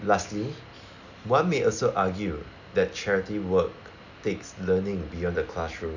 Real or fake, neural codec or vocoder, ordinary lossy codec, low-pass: real; none; none; 7.2 kHz